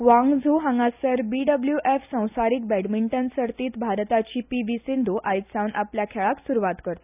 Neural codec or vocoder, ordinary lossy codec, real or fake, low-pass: none; none; real; 3.6 kHz